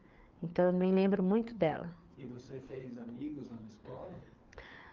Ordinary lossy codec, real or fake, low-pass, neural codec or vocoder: Opus, 32 kbps; fake; 7.2 kHz; codec, 16 kHz, 4 kbps, FreqCodec, larger model